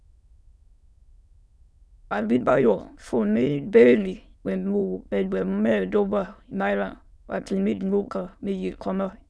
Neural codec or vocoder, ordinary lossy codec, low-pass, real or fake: autoencoder, 22.05 kHz, a latent of 192 numbers a frame, VITS, trained on many speakers; none; none; fake